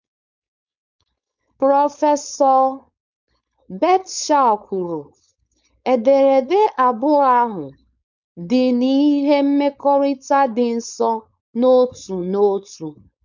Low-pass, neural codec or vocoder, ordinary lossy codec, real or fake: 7.2 kHz; codec, 16 kHz, 4.8 kbps, FACodec; none; fake